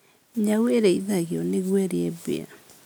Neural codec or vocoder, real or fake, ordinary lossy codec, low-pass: none; real; none; none